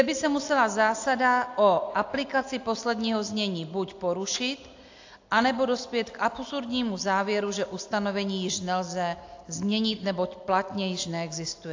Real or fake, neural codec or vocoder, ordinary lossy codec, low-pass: real; none; AAC, 48 kbps; 7.2 kHz